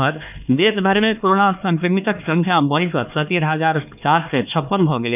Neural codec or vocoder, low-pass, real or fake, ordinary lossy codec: codec, 16 kHz, 2 kbps, X-Codec, HuBERT features, trained on LibriSpeech; 3.6 kHz; fake; none